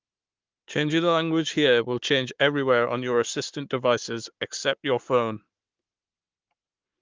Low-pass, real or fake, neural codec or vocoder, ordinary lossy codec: 7.2 kHz; fake; codec, 44.1 kHz, 7.8 kbps, Pupu-Codec; Opus, 32 kbps